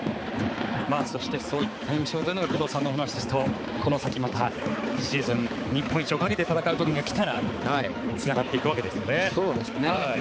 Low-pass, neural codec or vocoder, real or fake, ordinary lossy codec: none; codec, 16 kHz, 4 kbps, X-Codec, HuBERT features, trained on balanced general audio; fake; none